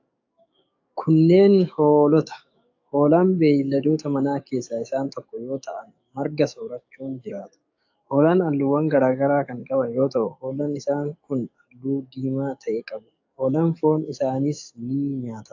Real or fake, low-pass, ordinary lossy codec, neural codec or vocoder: fake; 7.2 kHz; AAC, 48 kbps; codec, 44.1 kHz, 7.8 kbps, DAC